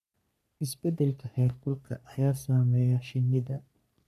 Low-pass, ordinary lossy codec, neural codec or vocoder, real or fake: 14.4 kHz; none; codec, 44.1 kHz, 3.4 kbps, Pupu-Codec; fake